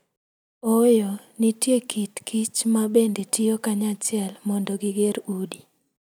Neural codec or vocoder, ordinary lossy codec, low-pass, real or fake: none; none; none; real